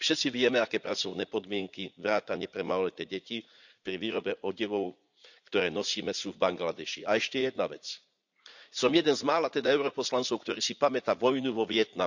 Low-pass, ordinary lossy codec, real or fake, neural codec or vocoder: 7.2 kHz; none; fake; vocoder, 44.1 kHz, 80 mel bands, Vocos